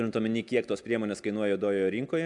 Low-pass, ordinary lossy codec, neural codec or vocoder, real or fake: 10.8 kHz; MP3, 96 kbps; none; real